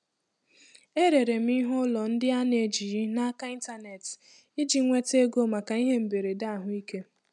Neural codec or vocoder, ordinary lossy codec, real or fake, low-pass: none; none; real; 10.8 kHz